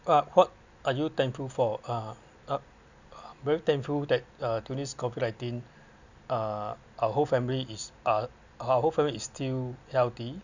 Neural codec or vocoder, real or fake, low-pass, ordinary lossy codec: none; real; 7.2 kHz; none